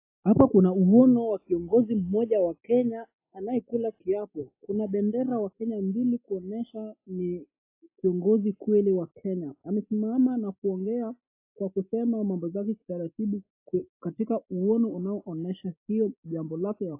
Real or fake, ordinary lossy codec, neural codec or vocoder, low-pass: real; AAC, 24 kbps; none; 3.6 kHz